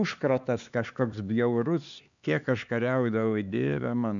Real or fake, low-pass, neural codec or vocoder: fake; 7.2 kHz; codec, 16 kHz, 4 kbps, X-Codec, HuBERT features, trained on LibriSpeech